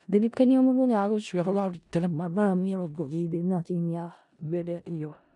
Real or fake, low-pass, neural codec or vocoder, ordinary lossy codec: fake; 10.8 kHz; codec, 16 kHz in and 24 kHz out, 0.4 kbps, LongCat-Audio-Codec, four codebook decoder; MP3, 96 kbps